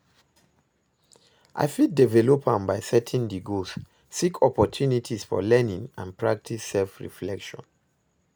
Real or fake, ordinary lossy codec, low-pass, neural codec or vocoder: real; none; none; none